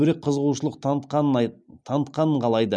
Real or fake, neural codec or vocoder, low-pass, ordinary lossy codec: real; none; none; none